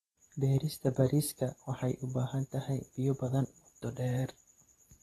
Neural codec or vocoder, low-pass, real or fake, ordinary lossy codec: vocoder, 44.1 kHz, 128 mel bands every 512 samples, BigVGAN v2; 19.8 kHz; fake; AAC, 32 kbps